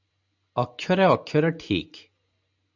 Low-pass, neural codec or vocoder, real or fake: 7.2 kHz; none; real